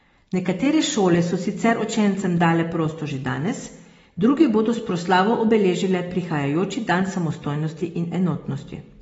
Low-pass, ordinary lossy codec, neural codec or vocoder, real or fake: 19.8 kHz; AAC, 24 kbps; none; real